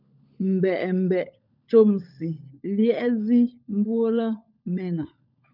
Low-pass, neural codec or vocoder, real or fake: 5.4 kHz; codec, 16 kHz, 16 kbps, FunCodec, trained on LibriTTS, 50 frames a second; fake